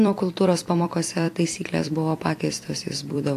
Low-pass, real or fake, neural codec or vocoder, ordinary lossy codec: 14.4 kHz; fake; vocoder, 44.1 kHz, 128 mel bands every 256 samples, BigVGAN v2; AAC, 64 kbps